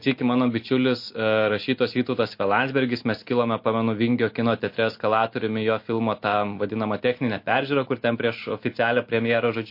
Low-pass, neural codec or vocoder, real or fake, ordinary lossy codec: 5.4 kHz; none; real; MP3, 32 kbps